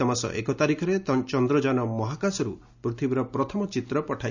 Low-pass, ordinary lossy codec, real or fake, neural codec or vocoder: 7.2 kHz; none; real; none